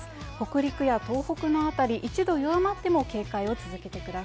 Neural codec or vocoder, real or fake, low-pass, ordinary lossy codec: none; real; none; none